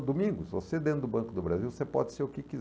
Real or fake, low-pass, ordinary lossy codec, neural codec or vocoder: real; none; none; none